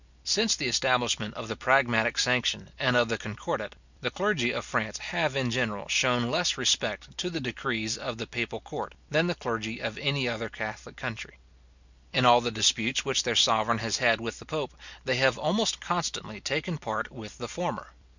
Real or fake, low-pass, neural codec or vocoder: real; 7.2 kHz; none